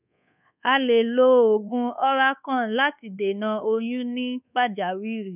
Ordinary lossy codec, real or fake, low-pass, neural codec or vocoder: none; fake; 3.6 kHz; codec, 24 kHz, 1.2 kbps, DualCodec